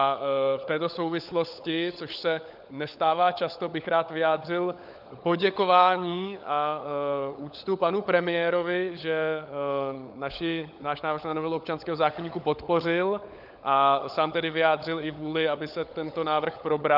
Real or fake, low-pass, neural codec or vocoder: fake; 5.4 kHz; codec, 16 kHz, 16 kbps, FunCodec, trained on LibriTTS, 50 frames a second